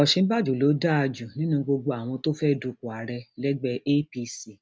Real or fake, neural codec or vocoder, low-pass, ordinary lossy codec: real; none; none; none